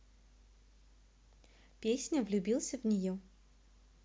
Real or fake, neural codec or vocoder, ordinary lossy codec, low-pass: real; none; none; none